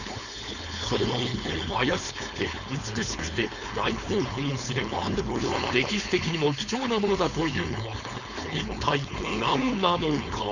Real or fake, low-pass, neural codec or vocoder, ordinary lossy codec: fake; 7.2 kHz; codec, 16 kHz, 4.8 kbps, FACodec; none